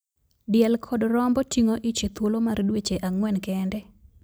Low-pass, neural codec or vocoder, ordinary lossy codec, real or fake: none; none; none; real